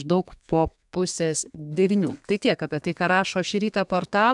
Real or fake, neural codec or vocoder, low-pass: fake; codec, 32 kHz, 1.9 kbps, SNAC; 10.8 kHz